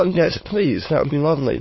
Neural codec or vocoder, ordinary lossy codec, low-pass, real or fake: autoencoder, 22.05 kHz, a latent of 192 numbers a frame, VITS, trained on many speakers; MP3, 24 kbps; 7.2 kHz; fake